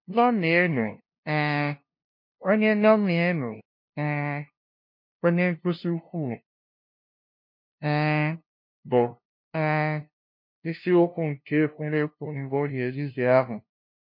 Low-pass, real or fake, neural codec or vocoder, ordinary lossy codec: 5.4 kHz; fake; codec, 16 kHz, 0.5 kbps, FunCodec, trained on LibriTTS, 25 frames a second; MP3, 32 kbps